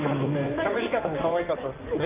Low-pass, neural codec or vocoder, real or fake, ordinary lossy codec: 3.6 kHz; codec, 16 kHz in and 24 kHz out, 2.2 kbps, FireRedTTS-2 codec; fake; Opus, 24 kbps